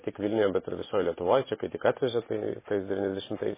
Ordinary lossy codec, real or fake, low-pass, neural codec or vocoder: MP3, 16 kbps; fake; 3.6 kHz; vocoder, 44.1 kHz, 128 mel bands every 512 samples, BigVGAN v2